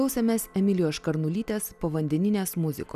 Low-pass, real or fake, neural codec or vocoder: 14.4 kHz; real; none